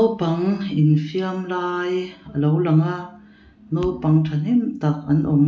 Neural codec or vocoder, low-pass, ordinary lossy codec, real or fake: none; none; none; real